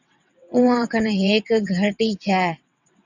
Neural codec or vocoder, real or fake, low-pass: vocoder, 22.05 kHz, 80 mel bands, WaveNeXt; fake; 7.2 kHz